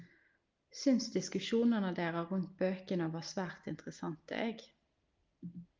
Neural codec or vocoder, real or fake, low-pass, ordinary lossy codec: none; real; 7.2 kHz; Opus, 32 kbps